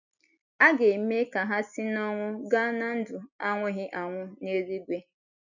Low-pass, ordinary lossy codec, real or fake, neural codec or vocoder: 7.2 kHz; none; real; none